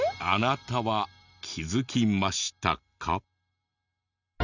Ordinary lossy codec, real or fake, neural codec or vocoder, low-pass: none; real; none; 7.2 kHz